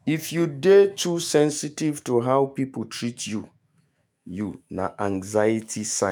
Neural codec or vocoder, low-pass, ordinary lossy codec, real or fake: autoencoder, 48 kHz, 128 numbers a frame, DAC-VAE, trained on Japanese speech; none; none; fake